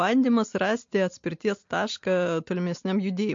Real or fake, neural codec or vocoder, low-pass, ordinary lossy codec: real; none; 7.2 kHz; MP3, 48 kbps